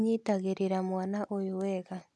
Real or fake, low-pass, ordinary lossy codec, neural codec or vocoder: real; none; none; none